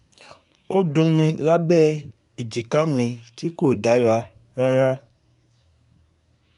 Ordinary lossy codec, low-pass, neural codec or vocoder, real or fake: none; 10.8 kHz; codec, 24 kHz, 1 kbps, SNAC; fake